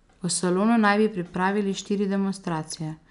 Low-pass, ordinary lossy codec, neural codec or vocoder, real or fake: 10.8 kHz; none; none; real